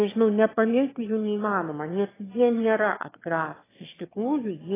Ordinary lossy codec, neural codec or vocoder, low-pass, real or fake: AAC, 16 kbps; autoencoder, 22.05 kHz, a latent of 192 numbers a frame, VITS, trained on one speaker; 3.6 kHz; fake